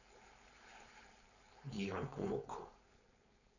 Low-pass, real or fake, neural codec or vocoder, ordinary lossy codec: 7.2 kHz; fake; codec, 16 kHz, 4.8 kbps, FACodec; none